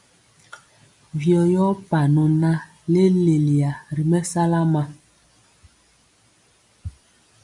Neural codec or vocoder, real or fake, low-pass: none; real; 10.8 kHz